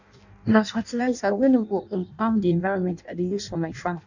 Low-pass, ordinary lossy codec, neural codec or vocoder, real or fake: 7.2 kHz; none; codec, 16 kHz in and 24 kHz out, 0.6 kbps, FireRedTTS-2 codec; fake